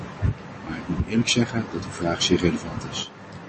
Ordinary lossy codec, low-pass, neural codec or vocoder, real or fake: MP3, 32 kbps; 9.9 kHz; none; real